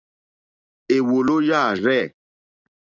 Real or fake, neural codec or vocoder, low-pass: real; none; 7.2 kHz